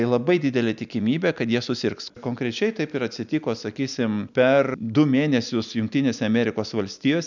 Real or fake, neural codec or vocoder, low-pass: real; none; 7.2 kHz